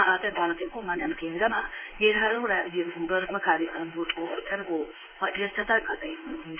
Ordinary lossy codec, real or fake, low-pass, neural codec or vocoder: none; fake; 3.6 kHz; codec, 16 kHz in and 24 kHz out, 1 kbps, XY-Tokenizer